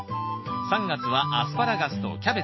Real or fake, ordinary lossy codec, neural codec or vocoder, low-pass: real; MP3, 24 kbps; none; 7.2 kHz